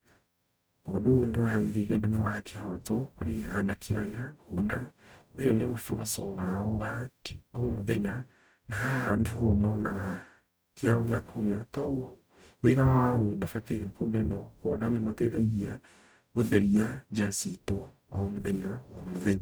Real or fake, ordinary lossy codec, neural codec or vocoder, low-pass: fake; none; codec, 44.1 kHz, 0.9 kbps, DAC; none